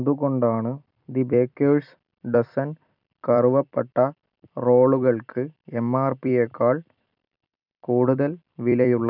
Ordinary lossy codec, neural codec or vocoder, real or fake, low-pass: none; vocoder, 44.1 kHz, 128 mel bands every 256 samples, BigVGAN v2; fake; 5.4 kHz